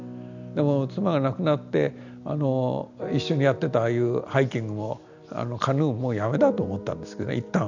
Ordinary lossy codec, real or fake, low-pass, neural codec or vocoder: none; real; 7.2 kHz; none